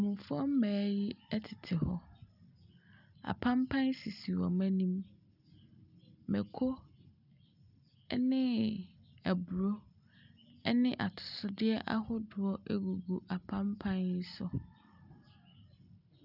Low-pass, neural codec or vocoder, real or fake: 5.4 kHz; none; real